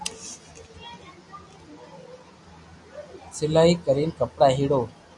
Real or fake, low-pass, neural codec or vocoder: real; 10.8 kHz; none